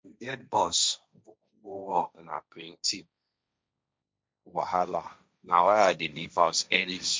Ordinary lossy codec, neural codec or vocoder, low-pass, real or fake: none; codec, 16 kHz, 1.1 kbps, Voila-Tokenizer; none; fake